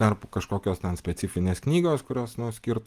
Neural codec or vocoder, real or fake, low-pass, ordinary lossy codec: none; real; 14.4 kHz; Opus, 24 kbps